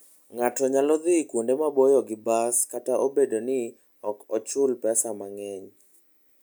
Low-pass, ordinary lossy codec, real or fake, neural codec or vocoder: none; none; real; none